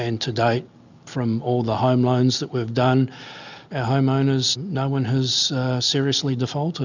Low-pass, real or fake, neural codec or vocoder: 7.2 kHz; real; none